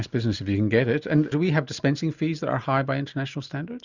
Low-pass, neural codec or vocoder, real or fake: 7.2 kHz; none; real